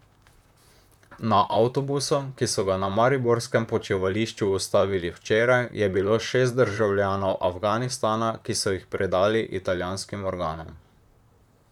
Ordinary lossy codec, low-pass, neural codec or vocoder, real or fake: none; 19.8 kHz; vocoder, 44.1 kHz, 128 mel bands, Pupu-Vocoder; fake